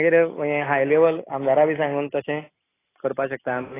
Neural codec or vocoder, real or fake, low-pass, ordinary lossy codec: none; real; 3.6 kHz; AAC, 16 kbps